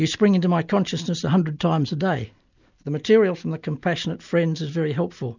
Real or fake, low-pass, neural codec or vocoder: real; 7.2 kHz; none